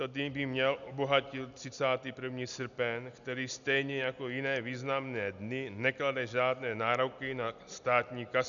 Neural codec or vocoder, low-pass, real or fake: none; 7.2 kHz; real